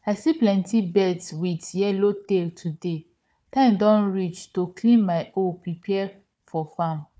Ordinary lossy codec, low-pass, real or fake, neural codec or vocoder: none; none; fake; codec, 16 kHz, 4 kbps, FunCodec, trained on Chinese and English, 50 frames a second